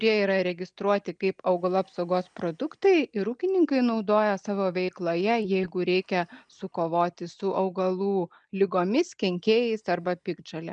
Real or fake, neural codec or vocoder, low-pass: real; none; 9.9 kHz